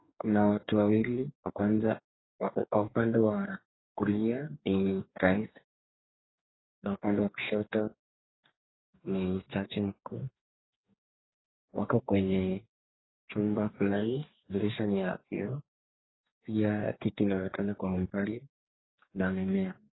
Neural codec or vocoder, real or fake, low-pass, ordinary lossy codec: codec, 44.1 kHz, 2.6 kbps, DAC; fake; 7.2 kHz; AAC, 16 kbps